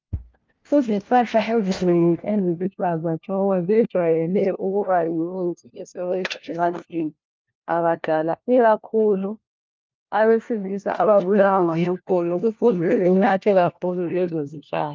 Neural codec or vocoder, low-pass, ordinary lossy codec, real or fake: codec, 16 kHz, 1 kbps, FunCodec, trained on LibriTTS, 50 frames a second; 7.2 kHz; Opus, 32 kbps; fake